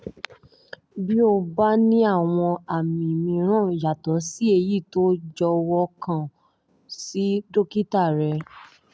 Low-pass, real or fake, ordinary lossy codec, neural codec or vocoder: none; real; none; none